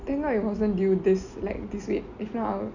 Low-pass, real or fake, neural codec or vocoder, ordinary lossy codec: 7.2 kHz; real; none; none